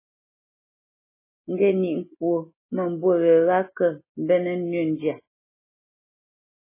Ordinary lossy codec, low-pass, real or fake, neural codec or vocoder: MP3, 16 kbps; 3.6 kHz; real; none